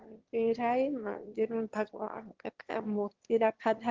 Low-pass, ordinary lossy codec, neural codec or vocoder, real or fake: 7.2 kHz; Opus, 16 kbps; autoencoder, 22.05 kHz, a latent of 192 numbers a frame, VITS, trained on one speaker; fake